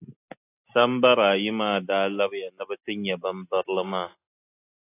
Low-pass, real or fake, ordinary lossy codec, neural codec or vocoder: 3.6 kHz; real; AAC, 24 kbps; none